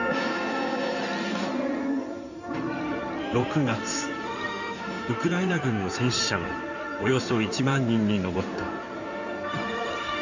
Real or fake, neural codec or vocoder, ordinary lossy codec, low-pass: fake; codec, 16 kHz in and 24 kHz out, 2.2 kbps, FireRedTTS-2 codec; none; 7.2 kHz